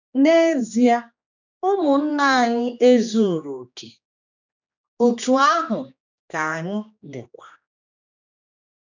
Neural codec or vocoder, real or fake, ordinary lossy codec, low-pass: codec, 16 kHz, 2 kbps, X-Codec, HuBERT features, trained on general audio; fake; none; 7.2 kHz